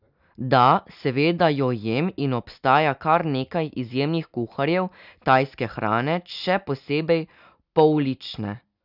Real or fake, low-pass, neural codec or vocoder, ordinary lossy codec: real; 5.4 kHz; none; none